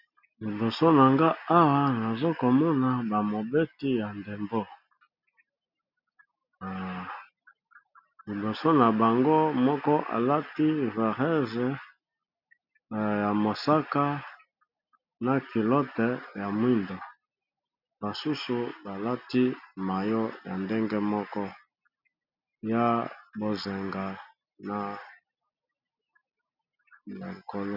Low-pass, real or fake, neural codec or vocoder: 5.4 kHz; real; none